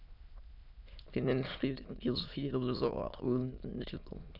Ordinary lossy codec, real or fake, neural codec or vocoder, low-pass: none; fake; autoencoder, 22.05 kHz, a latent of 192 numbers a frame, VITS, trained on many speakers; 5.4 kHz